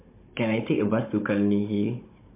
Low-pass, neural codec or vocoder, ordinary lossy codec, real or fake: 3.6 kHz; codec, 16 kHz, 16 kbps, FunCodec, trained on Chinese and English, 50 frames a second; MP3, 24 kbps; fake